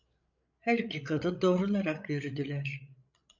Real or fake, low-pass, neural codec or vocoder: fake; 7.2 kHz; codec, 16 kHz, 8 kbps, FreqCodec, larger model